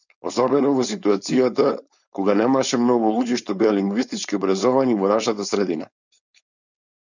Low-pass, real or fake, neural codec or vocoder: 7.2 kHz; fake; codec, 16 kHz, 4.8 kbps, FACodec